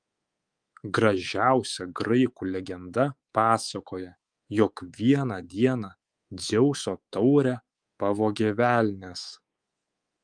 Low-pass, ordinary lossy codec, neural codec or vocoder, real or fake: 9.9 kHz; Opus, 32 kbps; codec, 24 kHz, 3.1 kbps, DualCodec; fake